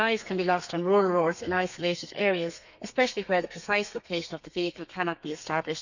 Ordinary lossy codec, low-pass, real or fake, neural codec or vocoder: none; 7.2 kHz; fake; codec, 32 kHz, 1.9 kbps, SNAC